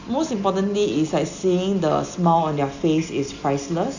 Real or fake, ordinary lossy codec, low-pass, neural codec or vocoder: real; none; 7.2 kHz; none